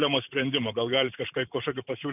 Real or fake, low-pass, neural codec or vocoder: fake; 3.6 kHz; vocoder, 44.1 kHz, 128 mel bands every 512 samples, BigVGAN v2